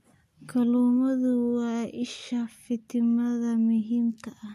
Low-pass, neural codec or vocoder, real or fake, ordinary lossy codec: 14.4 kHz; none; real; MP3, 64 kbps